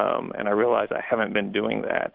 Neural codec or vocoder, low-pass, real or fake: none; 5.4 kHz; real